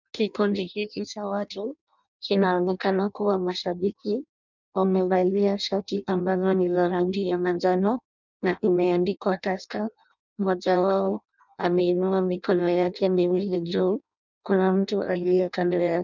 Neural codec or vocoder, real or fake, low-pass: codec, 16 kHz in and 24 kHz out, 0.6 kbps, FireRedTTS-2 codec; fake; 7.2 kHz